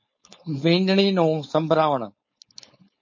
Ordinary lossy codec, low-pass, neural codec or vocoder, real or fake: MP3, 32 kbps; 7.2 kHz; codec, 16 kHz, 4.8 kbps, FACodec; fake